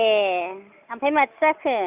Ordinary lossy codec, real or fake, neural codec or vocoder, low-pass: Opus, 64 kbps; real; none; 3.6 kHz